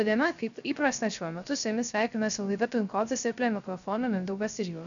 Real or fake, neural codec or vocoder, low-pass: fake; codec, 16 kHz, 0.3 kbps, FocalCodec; 7.2 kHz